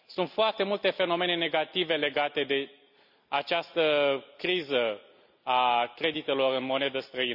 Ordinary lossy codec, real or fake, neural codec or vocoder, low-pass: none; real; none; 5.4 kHz